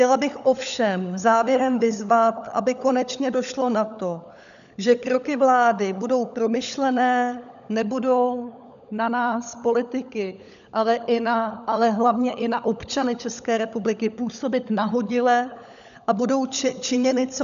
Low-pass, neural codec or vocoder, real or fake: 7.2 kHz; codec, 16 kHz, 16 kbps, FunCodec, trained on LibriTTS, 50 frames a second; fake